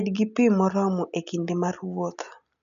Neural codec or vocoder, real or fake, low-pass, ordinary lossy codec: none; real; 7.2 kHz; none